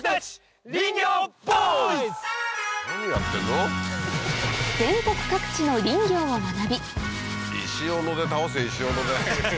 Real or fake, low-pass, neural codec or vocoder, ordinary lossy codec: real; none; none; none